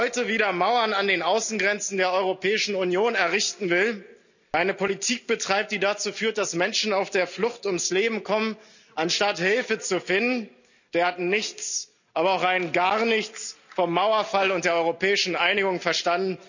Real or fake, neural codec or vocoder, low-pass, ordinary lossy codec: real; none; 7.2 kHz; none